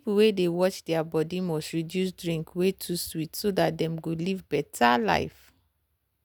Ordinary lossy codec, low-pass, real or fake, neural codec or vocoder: none; none; real; none